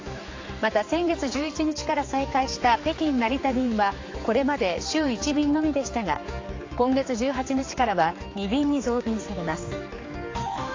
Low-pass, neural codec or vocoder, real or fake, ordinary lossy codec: 7.2 kHz; codec, 16 kHz, 4 kbps, X-Codec, HuBERT features, trained on general audio; fake; AAC, 32 kbps